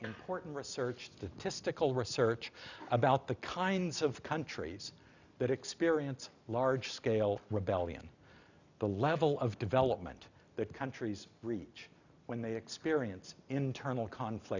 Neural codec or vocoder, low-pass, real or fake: none; 7.2 kHz; real